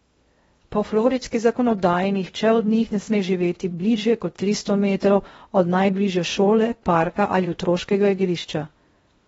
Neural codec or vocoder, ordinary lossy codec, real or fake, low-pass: codec, 16 kHz in and 24 kHz out, 0.6 kbps, FocalCodec, streaming, 2048 codes; AAC, 24 kbps; fake; 10.8 kHz